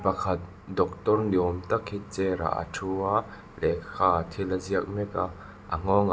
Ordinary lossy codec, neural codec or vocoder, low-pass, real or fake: none; none; none; real